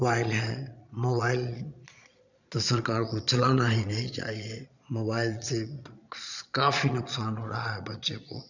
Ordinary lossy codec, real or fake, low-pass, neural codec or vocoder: none; fake; 7.2 kHz; vocoder, 22.05 kHz, 80 mel bands, Vocos